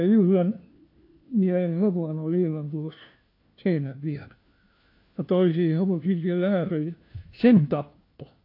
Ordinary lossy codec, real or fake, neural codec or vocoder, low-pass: AAC, 32 kbps; fake; codec, 16 kHz, 1 kbps, FunCodec, trained on LibriTTS, 50 frames a second; 5.4 kHz